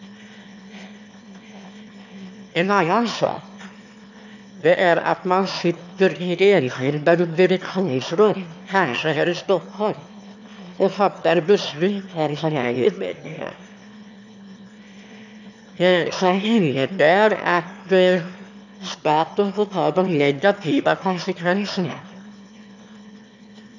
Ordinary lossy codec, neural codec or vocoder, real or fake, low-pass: none; autoencoder, 22.05 kHz, a latent of 192 numbers a frame, VITS, trained on one speaker; fake; 7.2 kHz